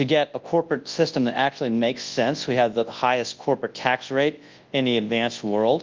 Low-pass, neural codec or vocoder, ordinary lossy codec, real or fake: 7.2 kHz; codec, 24 kHz, 0.9 kbps, WavTokenizer, large speech release; Opus, 32 kbps; fake